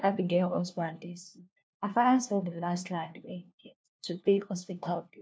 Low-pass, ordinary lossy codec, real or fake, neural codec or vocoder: none; none; fake; codec, 16 kHz, 1 kbps, FunCodec, trained on LibriTTS, 50 frames a second